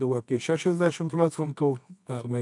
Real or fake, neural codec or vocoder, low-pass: fake; codec, 24 kHz, 0.9 kbps, WavTokenizer, medium music audio release; 10.8 kHz